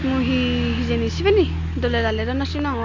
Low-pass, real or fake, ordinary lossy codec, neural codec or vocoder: 7.2 kHz; real; AAC, 48 kbps; none